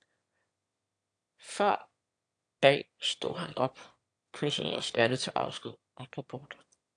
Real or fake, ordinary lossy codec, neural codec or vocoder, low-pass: fake; AAC, 64 kbps; autoencoder, 22.05 kHz, a latent of 192 numbers a frame, VITS, trained on one speaker; 9.9 kHz